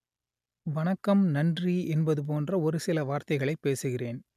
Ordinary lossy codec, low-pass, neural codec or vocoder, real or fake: none; 14.4 kHz; none; real